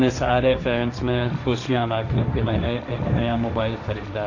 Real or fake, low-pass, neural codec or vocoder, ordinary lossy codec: fake; 7.2 kHz; codec, 16 kHz, 1.1 kbps, Voila-Tokenizer; none